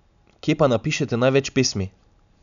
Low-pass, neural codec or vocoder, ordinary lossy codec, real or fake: 7.2 kHz; none; none; real